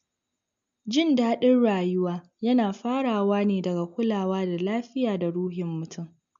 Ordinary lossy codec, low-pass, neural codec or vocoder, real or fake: MP3, 64 kbps; 7.2 kHz; none; real